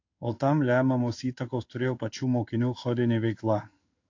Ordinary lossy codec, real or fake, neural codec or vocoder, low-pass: AAC, 48 kbps; fake; codec, 16 kHz in and 24 kHz out, 1 kbps, XY-Tokenizer; 7.2 kHz